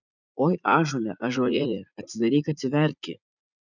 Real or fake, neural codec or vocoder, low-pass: fake; vocoder, 44.1 kHz, 80 mel bands, Vocos; 7.2 kHz